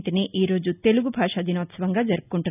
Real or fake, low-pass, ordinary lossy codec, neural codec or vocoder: real; 3.6 kHz; none; none